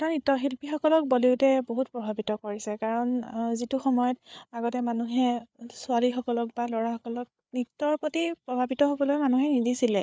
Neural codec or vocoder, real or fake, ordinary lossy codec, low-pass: codec, 16 kHz, 8 kbps, FreqCodec, larger model; fake; none; none